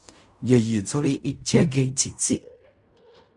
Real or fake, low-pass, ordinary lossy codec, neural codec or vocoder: fake; 10.8 kHz; Opus, 64 kbps; codec, 16 kHz in and 24 kHz out, 0.4 kbps, LongCat-Audio-Codec, fine tuned four codebook decoder